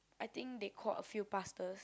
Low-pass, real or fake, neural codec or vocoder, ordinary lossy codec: none; real; none; none